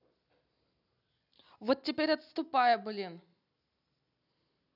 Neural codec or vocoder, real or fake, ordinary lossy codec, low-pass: none; real; none; 5.4 kHz